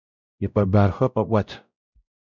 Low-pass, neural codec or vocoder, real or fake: 7.2 kHz; codec, 16 kHz, 0.5 kbps, X-Codec, WavLM features, trained on Multilingual LibriSpeech; fake